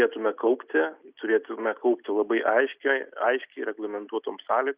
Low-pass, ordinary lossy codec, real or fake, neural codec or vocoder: 3.6 kHz; Opus, 64 kbps; real; none